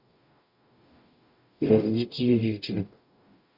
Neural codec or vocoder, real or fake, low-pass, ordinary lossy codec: codec, 44.1 kHz, 0.9 kbps, DAC; fake; 5.4 kHz; none